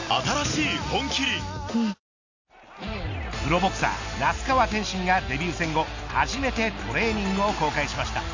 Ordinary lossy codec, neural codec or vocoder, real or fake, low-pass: AAC, 48 kbps; none; real; 7.2 kHz